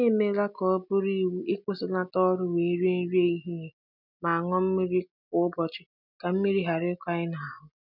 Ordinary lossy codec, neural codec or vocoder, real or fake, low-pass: none; none; real; 5.4 kHz